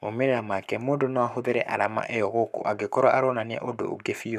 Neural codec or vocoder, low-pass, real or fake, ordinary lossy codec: codec, 44.1 kHz, 7.8 kbps, Pupu-Codec; 14.4 kHz; fake; none